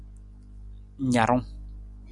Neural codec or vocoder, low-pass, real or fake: none; 10.8 kHz; real